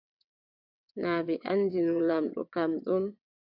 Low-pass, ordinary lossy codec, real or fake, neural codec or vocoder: 5.4 kHz; AAC, 48 kbps; fake; vocoder, 22.05 kHz, 80 mel bands, WaveNeXt